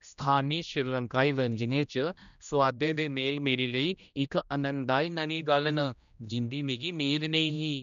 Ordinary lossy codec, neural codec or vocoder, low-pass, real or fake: none; codec, 16 kHz, 1 kbps, X-Codec, HuBERT features, trained on general audio; 7.2 kHz; fake